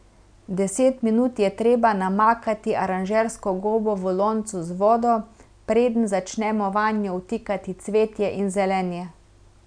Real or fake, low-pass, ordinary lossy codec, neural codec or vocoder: real; 9.9 kHz; none; none